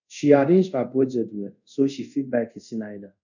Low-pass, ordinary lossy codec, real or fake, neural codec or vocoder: 7.2 kHz; none; fake; codec, 24 kHz, 0.5 kbps, DualCodec